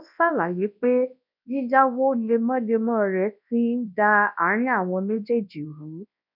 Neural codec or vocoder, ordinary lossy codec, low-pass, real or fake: codec, 24 kHz, 0.9 kbps, WavTokenizer, large speech release; none; 5.4 kHz; fake